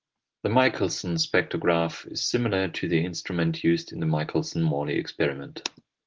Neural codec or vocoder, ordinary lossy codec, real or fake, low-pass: none; Opus, 16 kbps; real; 7.2 kHz